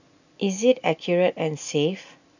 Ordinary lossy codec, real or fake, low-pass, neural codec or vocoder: none; real; 7.2 kHz; none